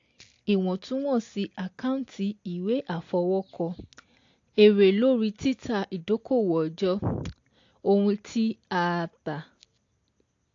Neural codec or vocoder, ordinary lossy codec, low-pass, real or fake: none; AAC, 48 kbps; 7.2 kHz; real